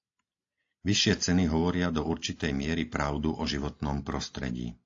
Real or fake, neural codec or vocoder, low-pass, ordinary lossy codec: real; none; 7.2 kHz; AAC, 48 kbps